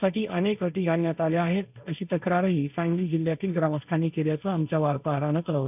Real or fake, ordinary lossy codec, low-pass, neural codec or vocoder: fake; none; 3.6 kHz; codec, 16 kHz, 1.1 kbps, Voila-Tokenizer